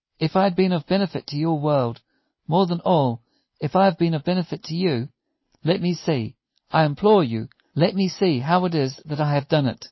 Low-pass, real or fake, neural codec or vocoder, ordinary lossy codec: 7.2 kHz; real; none; MP3, 24 kbps